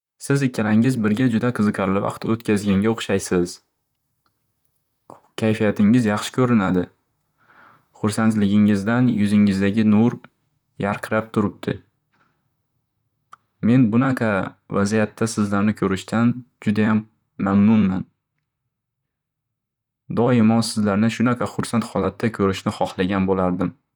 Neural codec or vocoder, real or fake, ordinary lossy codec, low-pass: vocoder, 44.1 kHz, 128 mel bands, Pupu-Vocoder; fake; none; 19.8 kHz